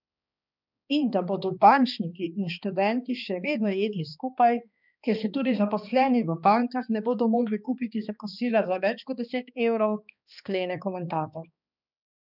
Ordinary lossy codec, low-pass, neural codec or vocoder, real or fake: none; 5.4 kHz; codec, 16 kHz, 2 kbps, X-Codec, HuBERT features, trained on balanced general audio; fake